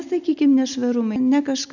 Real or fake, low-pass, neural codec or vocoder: real; 7.2 kHz; none